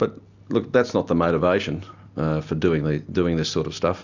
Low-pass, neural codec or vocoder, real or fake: 7.2 kHz; none; real